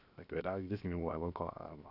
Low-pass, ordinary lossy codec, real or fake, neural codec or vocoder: 5.4 kHz; none; fake; codec, 16 kHz, 0.7 kbps, FocalCodec